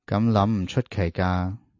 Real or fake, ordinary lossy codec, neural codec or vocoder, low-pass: real; AAC, 48 kbps; none; 7.2 kHz